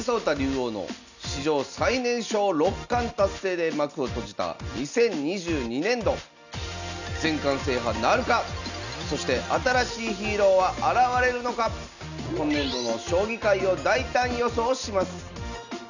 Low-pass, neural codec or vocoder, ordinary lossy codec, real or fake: 7.2 kHz; none; none; real